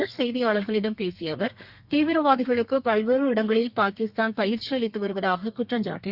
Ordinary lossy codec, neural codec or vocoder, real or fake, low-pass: Opus, 64 kbps; codec, 32 kHz, 1.9 kbps, SNAC; fake; 5.4 kHz